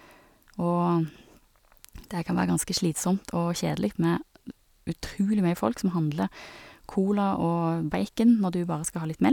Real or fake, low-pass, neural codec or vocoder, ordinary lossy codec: real; 19.8 kHz; none; none